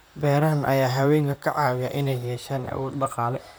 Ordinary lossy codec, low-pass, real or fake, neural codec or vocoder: none; none; fake; vocoder, 44.1 kHz, 128 mel bands, Pupu-Vocoder